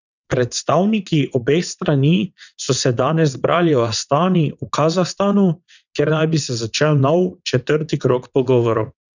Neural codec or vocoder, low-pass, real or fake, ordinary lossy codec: vocoder, 22.05 kHz, 80 mel bands, WaveNeXt; 7.2 kHz; fake; none